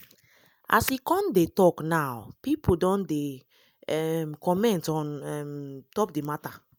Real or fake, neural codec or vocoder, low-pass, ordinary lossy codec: real; none; none; none